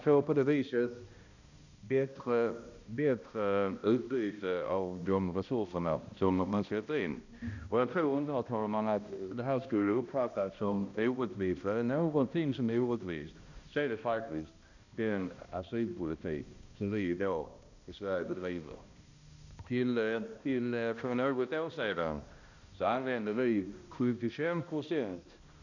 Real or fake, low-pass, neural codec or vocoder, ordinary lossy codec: fake; 7.2 kHz; codec, 16 kHz, 1 kbps, X-Codec, HuBERT features, trained on balanced general audio; none